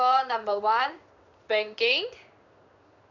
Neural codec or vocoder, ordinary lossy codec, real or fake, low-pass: codec, 16 kHz in and 24 kHz out, 1 kbps, XY-Tokenizer; none; fake; 7.2 kHz